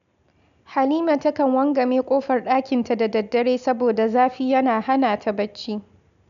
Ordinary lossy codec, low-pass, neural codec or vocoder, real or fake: none; 7.2 kHz; none; real